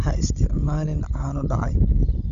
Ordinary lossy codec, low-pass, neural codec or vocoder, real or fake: Opus, 64 kbps; 7.2 kHz; codec, 16 kHz, 16 kbps, FunCodec, trained on Chinese and English, 50 frames a second; fake